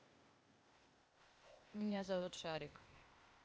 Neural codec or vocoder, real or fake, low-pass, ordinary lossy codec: codec, 16 kHz, 0.8 kbps, ZipCodec; fake; none; none